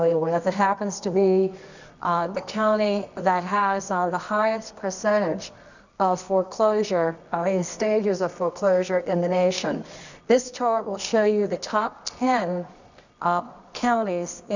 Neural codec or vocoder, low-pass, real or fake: codec, 24 kHz, 0.9 kbps, WavTokenizer, medium music audio release; 7.2 kHz; fake